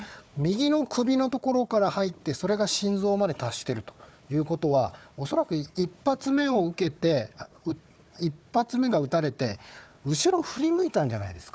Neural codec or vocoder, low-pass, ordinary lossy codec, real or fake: codec, 16 kHz, 4 kbps, FunCodec, trained on Chinese and English, 50 frames a second; none; none; fake